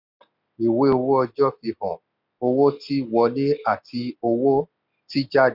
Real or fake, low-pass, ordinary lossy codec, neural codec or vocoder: real; 5.4 kHz; none; none